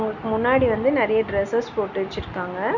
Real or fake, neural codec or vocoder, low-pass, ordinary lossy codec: real; none; 7.2 kHz; none